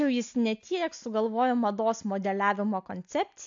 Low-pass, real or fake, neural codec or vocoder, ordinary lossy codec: 7.2 kHz; real; none; AAC, 64 kbps